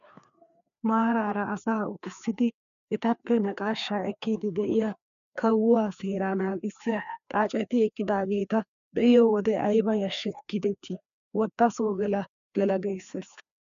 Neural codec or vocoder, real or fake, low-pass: codec, 16 kHz, 2 kbps, FreqCodec, larger model; fake; 7.2 kHz